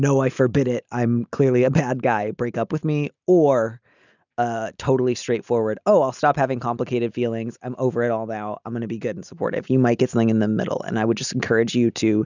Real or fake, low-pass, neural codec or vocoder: real; 7.2 kHz; none